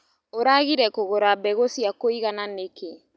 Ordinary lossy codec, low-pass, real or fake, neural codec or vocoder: none; none; real; none